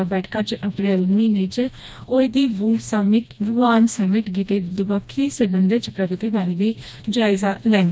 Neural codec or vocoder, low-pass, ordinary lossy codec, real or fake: codec, 16 kHz, 1 kbps, FreqCodec, smaller model; none; none; fake